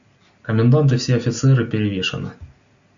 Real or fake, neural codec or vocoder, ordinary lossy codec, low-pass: real; none; Opus, 64 kbps; 7.2 kHz